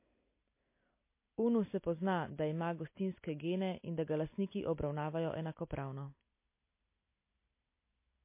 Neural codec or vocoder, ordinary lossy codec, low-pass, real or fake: none; MP3, 24 kbps; 3.6 kHz; real